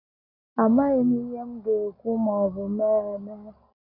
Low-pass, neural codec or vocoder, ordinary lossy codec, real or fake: 5.4 kHz; none; none; real